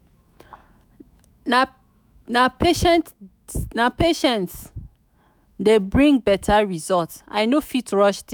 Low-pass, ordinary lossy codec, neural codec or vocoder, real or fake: none; none; autoencoder, 48 kHz, 128 numbers a frame, DAC-VAE, trained on Japanese speech; fake